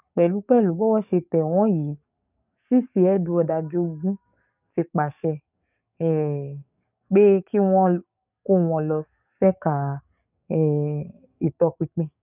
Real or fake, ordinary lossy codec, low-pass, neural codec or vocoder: fake; none; 3.6 kHz; codec, 44.1 kHz, 7.8 kbps, DAC